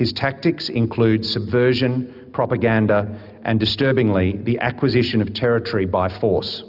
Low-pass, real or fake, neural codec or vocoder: 5.4 kHz; real; none